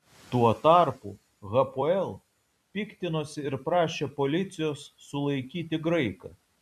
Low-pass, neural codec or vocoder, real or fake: 14.4 kHz; vocoder, 44.1 kHz, 128 mel bands every 256 samples, BigVGAN v2; fake